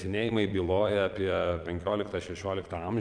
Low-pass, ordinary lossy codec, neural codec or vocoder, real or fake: 9.9 kHz; MP3, 96 kbps; vocoder, 22.05 kHz, 80 mel bands, Vocos; fake